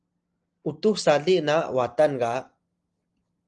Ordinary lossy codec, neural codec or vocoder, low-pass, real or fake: Opus, 24 kbps; none; 10.8 kHz; real